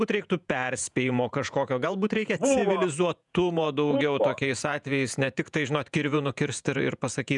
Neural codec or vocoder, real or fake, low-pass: vocoder, 48 kHz, 128 mel bands, Vocos; fake; 10.8 kHz